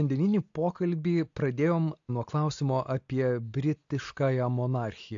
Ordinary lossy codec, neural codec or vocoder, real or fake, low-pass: AAC, 64 kbps; none; real; 7.2 kHz